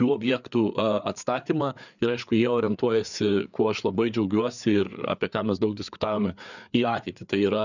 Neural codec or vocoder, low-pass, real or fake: codec, 16 kHz, 4 kbps, FreqCodec, larger model; 7.2 kHz; fake